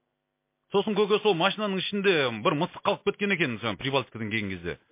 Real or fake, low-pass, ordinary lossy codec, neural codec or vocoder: real; 3.6 kHz; MP3, 24 kbps; none